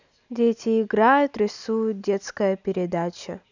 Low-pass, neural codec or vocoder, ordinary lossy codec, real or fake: 7.2 kHz; none; none; real